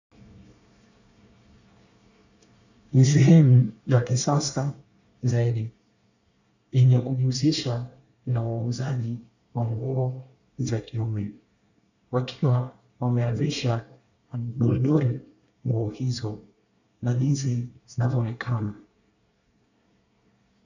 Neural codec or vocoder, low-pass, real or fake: codec, 24 kHz, 1 kbps, SNAC; 7.2 kHz; fake